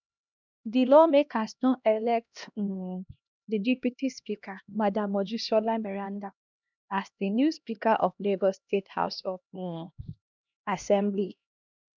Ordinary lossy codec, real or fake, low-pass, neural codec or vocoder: none; fake; 7.2 kHz; codec, 16 kHz, 1 kbps, X-Codec, HuBERT features, trained on LibriSpeech